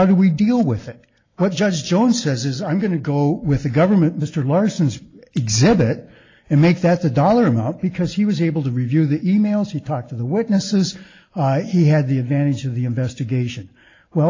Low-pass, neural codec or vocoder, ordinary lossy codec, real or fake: 7.2 kHz; none; AAC, 32 kbps; real